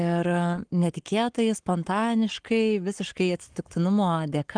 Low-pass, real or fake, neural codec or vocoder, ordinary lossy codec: 9.9 kHz; fake; codec, 44.1 kHz, 7.8 kbps, Pupu-Codec; Opus, 24 kbps